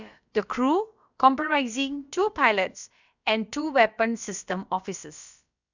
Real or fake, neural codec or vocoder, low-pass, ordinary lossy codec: fake; codec, 16 kHz, about 1 kbps, DyCAST, with the encoder's durations; 7.2 kHz; none